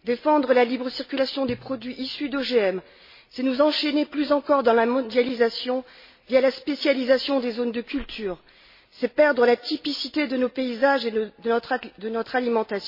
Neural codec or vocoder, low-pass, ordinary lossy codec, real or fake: none; 5.4 kHz; MP3, 24 kbps; real